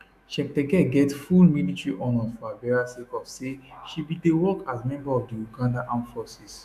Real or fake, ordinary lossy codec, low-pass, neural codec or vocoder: fake; none; 14.4 kHz; autoencoder, 48 kHz, 128 numbers a frame, DAC-VAE, trained on Japanese speech